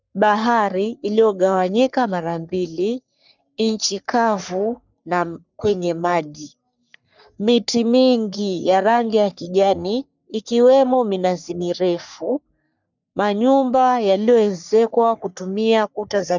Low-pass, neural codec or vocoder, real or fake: 7.2 kHz; codec, 44.1 kHz, 3.4 kbps, Pupu-Codec; fake